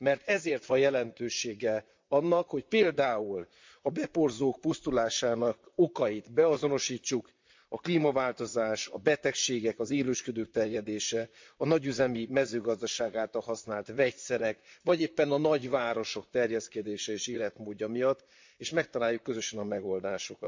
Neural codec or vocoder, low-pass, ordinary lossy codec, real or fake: vocoder, 44.1 kHz, 128 mel bands, Pupu-Vocoder; 7.2 kHz; MP3, 64 kbps; fake